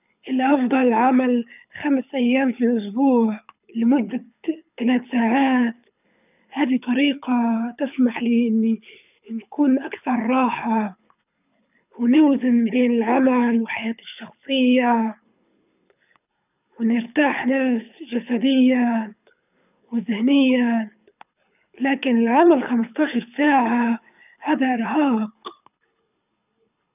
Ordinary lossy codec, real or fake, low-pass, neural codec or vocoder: none; fake; 3.6 kHz; codec, 24 kHz, 6 kbps, HILCodec